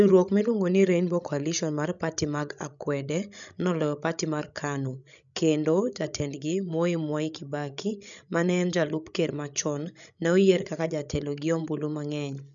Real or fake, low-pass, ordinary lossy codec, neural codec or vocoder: fake; 7.2 kHz; none; codec, 16 kHz, 16 kbps, FreqCodec, larger model